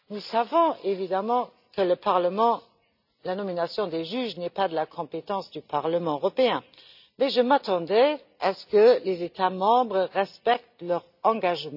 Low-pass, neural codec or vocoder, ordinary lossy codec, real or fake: 5.4 kHz; none; none; real